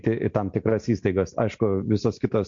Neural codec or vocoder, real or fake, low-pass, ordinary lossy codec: none; real; 7.2 kHz; MP3, 48 kbps